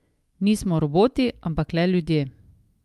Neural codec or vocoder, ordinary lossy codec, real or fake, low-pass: autoencoder, 48 kHz, 128 numbers a frame, DAC-VAE, trained on Japanese speech; Opus, 32 kbps; fake; 14.4 kHz